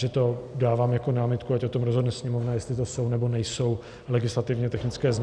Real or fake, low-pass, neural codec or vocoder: real; 9.9 kHz; none